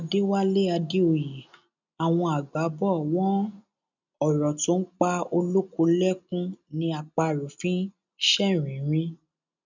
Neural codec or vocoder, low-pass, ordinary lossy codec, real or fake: none; 7.2 kHz; none; real